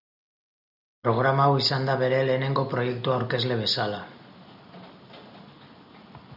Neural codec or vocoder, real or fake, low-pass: none; real; 5.4 kHz